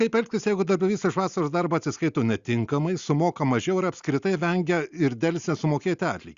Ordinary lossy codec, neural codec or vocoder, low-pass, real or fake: Opus, 64 kbps; none; 7.2 kHz; real